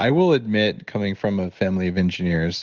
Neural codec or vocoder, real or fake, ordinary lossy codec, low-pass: none; real; Opus, 16 kbps; 7.2 kHz